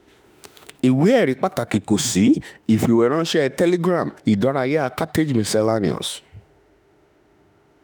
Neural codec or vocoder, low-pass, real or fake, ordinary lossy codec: autoencoder, 48 kHz, 32 numbers a frame, DAC-VAE, trained on Japanese speech; none; fake; none